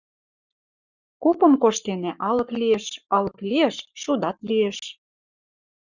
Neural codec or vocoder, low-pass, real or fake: codec, 44.1 kHz, 7.8 kbps, Pupu-Codec; 7.2 kHz; fake